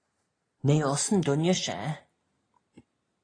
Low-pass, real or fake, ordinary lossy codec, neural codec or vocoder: 9.9 kHz; real; AAC, 32 kbps; none